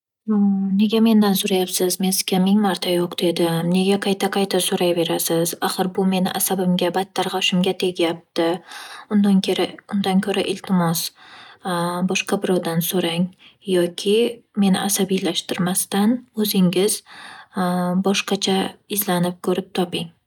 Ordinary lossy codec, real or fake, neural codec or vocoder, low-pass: none; real; none; 19.8 kHz